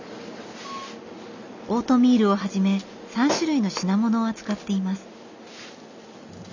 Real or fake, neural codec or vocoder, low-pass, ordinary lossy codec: real; none; 7.2 kHz; none